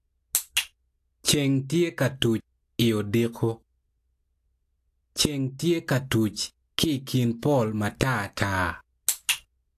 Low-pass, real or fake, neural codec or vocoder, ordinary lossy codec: 14.4 kHz; real; none; AAC, 64 kbps